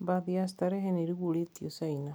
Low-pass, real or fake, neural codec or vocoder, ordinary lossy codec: none; real; none; none